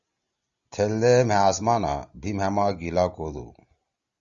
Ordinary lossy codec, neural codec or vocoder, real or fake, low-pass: Opus, 64 kbps; none; real; 7.2 kHz